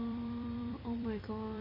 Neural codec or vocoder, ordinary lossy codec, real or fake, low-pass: vocoder, 44.1 kHz, 128 mel bands every 256 samples, BigVGAN v2; MP3, 24 kbps; fake; 5.4 kHz